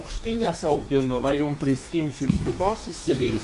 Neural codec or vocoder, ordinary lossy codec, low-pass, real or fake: codec, 24 kHz, 1 kbps, SNAC; AAC, 96 kbps; 10.8 kHz; fake